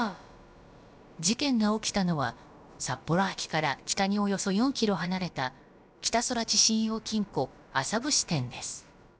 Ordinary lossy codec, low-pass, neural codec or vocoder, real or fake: none; none; codec, 16 kHz, about 1 kbps, DyCAST, with the encoder's durations; fake